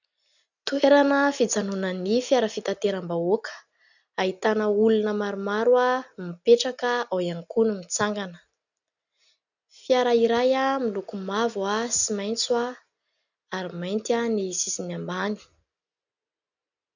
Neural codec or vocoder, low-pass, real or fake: none; 7.2 kHz; real